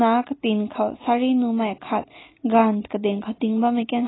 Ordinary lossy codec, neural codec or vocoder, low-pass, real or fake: AAC, 16 kbps; none; 7.2 kHz; real